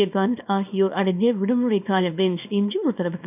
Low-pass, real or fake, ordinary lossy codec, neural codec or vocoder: 3.6 kHz; fake; none; codec, 24 kHz, 0.9 kbps, WavTokenizer, small release